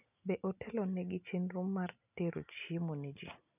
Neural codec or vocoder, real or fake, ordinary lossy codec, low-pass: none; real; none; 3.6 kHz